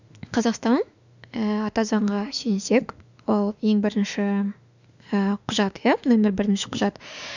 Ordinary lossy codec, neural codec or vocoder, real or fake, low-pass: none; autoencoder, 48 kHz, 32 numbers a frame, DAC-VAE, trained on Japanese speech; fake; 7.2 kHz